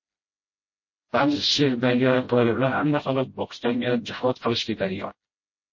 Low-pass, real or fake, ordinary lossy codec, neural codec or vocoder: 7.2 kHz; fake; MP3, 32 kbps; codec, 16 kHz, 0.5 kbps, FreqCodec, smaller model